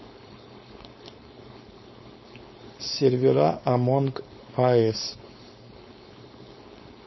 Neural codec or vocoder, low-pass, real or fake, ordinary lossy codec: codec, 16 kHz, 4.8 kbps, FACodec; 7.2 kHz; fake; MP3, 24 kbps